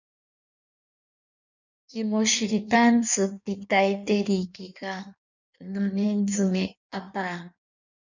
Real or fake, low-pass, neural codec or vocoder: fake; 7.2 kHz; codec, 16 kHz in and 24 kHz out, 1.1 kbps, FireRedTTS-2 codec